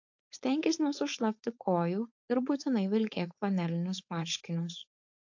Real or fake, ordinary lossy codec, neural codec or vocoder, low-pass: fake; AAC, 48 kbps; codec, 16 kHz, 4.8 kbps, FACodec; 7.2 kHz